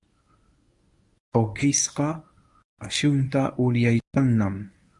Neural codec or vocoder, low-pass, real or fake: codec, 24 kHz, 0.9 kbps, WavTokenizer, medium speech release version 1; 10.8 kHz; fake